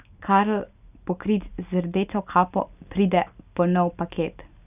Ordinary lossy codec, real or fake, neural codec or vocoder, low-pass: none; real; none; 3.6 kHz